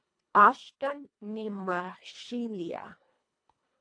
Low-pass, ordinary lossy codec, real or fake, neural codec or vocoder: 9.9 kHz; AAC, 48 kbps; fake; codec, 24 kHz, 1.5 kbps, HILCodec